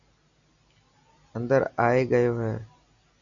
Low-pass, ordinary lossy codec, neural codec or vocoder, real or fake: 7.2 kHz; MP3, 96 kbps; none; real